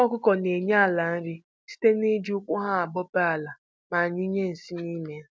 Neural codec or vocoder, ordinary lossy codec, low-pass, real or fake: none; none; none; real